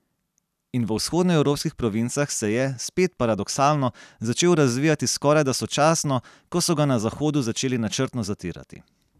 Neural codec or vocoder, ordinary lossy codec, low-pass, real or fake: none; none; 14.4 kHz; real